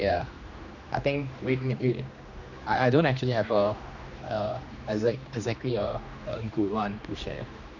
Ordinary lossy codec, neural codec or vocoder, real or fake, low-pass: none; codec, 16 kHz, 2 kbps, X-Codec, HuBERT features, trained on general audio; fake; 7.2 kHz